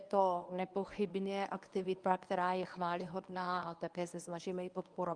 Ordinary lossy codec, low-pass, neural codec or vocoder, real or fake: Opus, 32 kbps; 9.9 kHz; codec, 24 kHz, 0.9 kbps, WavTokenizer, medium speech release version 1; fake